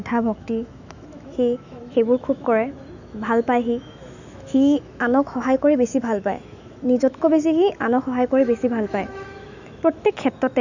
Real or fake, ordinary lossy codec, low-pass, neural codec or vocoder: fake; none; 7.2 kHz; autoencoder, 48 kHz, 128 numbers a frame, DAC-VAE, trained on Japanese speech